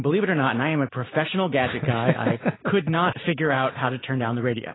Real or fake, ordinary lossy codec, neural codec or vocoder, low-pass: real; AAC, 16 kbps; none; 7.2 kHz